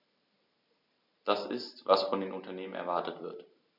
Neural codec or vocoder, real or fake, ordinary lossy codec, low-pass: none; real; none; 5.4 kHz